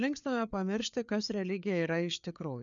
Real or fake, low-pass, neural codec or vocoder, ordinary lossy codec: fake; 7.2 kHz; codec, 16 kHz, 4 kbps, FreqCodec, larger model; MP3, 96 kbps